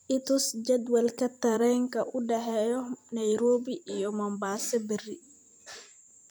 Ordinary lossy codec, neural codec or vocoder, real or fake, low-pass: none; vocoder, 44.1 kHz, 128 mel bands every 256 samples, BigVGAN v2; fake; none